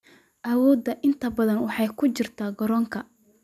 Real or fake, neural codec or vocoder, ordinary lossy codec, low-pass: real; none; none; 14.4 kHz